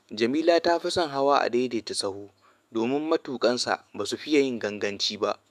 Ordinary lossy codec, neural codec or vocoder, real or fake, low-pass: AAC, 96 kbps; autoencoder, 48 kHz, 128 numbers a frame, DAC-VAE, trained on Japanese speech; fake; 14.4 kHz